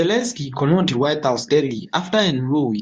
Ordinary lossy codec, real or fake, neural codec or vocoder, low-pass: Opus, 64 kbps; fake; codec, 24 kHz, 0.9 kbps, WavTokenizer, medium speech release version 2; 10.8 kHz